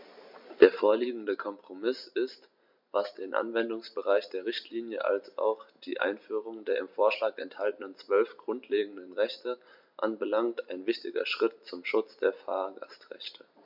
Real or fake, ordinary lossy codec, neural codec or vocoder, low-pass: real; MP3, 48 kbps; none; 5.4 kHz